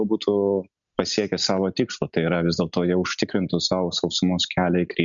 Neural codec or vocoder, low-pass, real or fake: none; 7.2 kHz; real